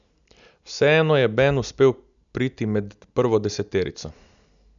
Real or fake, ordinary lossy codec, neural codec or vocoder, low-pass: real; none; none; 7.2 kHz